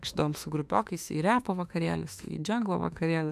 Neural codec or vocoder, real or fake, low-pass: autoencoder, 48 kHz, 32 numbers a frame, DAC-VAE, trained on Japanese speech; fake; 14.4 kHz